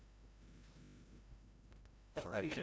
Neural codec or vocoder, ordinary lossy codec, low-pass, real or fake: codec, 16 kHz, 0.5 kbps, FreqCodec, larger model; none; none; fake